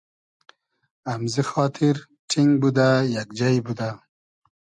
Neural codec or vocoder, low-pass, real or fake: none; 10.8 kHz; real